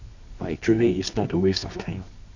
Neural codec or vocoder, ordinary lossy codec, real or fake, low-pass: codec, 24 kHz, 0.9 kbps, WavTokenizer, medium music audio release; none; fake; 7.2 kHz